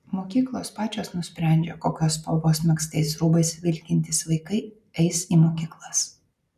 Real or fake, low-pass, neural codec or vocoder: real; 14.4 kHz; none